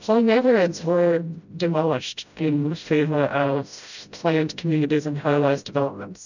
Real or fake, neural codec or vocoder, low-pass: fake; codec, 16 kHz, 0.5 kbps, FreqCodec, smaller model; 7.2 kHz